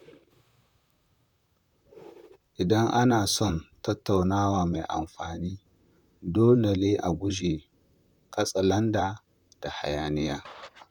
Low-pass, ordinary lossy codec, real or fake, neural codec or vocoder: 19.8 kHz; none; fake; vocoder, 44.1 kHz, 128 mel bands, Pupu-Vocoder